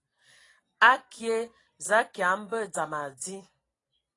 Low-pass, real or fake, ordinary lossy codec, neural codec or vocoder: 10.8 kHz; real; AAC, 32 kbps; none